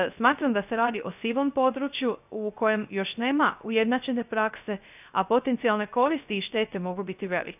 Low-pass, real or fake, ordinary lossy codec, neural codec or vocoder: 3.6 kHz; fake; none; codec, 16 kHz, 0.3 kbps, FocalCodec